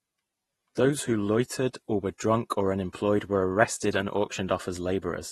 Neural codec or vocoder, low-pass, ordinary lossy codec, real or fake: vocoder, 48 kHz, 128 mel bands, Vocos; 19.8 kHz; AAC, 32 kbps; fake